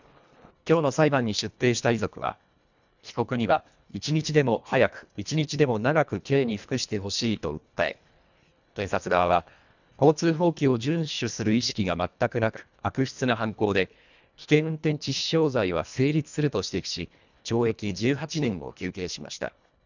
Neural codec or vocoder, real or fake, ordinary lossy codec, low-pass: codec, 24 kHz, 1.5 kbps, HILCodec; fake; none; 7.2 kHz